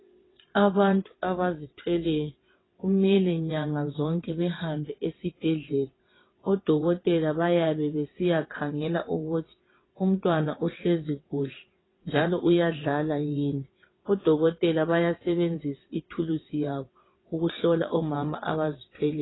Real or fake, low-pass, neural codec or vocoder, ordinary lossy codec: fake; 7.2 kHz; vocoder, 22.05 kHz, 80 mel bands, WaveNeXt; AAC, 16 kbps